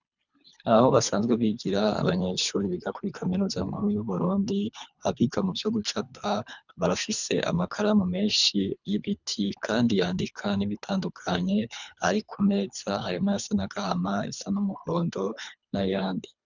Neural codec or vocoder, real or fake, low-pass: codec, 24 kHz, 3 kbps, HILCodec; fake; 7.2 kHz